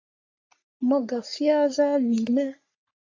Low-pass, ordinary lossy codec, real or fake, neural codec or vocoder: 7.2 kHz; AAC, 48 kbps; fake; codec, 44.1 kHz, 3.4 kbps, Pupu-Codec